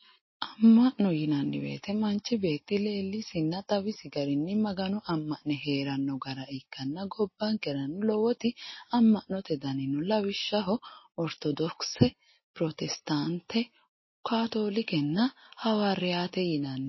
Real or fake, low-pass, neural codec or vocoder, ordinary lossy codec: real; 7.2 kHz; none; MP3, 24 kbps